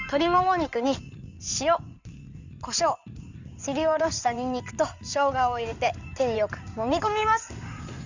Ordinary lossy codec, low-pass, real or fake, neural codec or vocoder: none; 7.2 kHz; fake; codec, 16 kHz in and 24 kHz out, 2.2 kbps, FireRedTTS-2 codec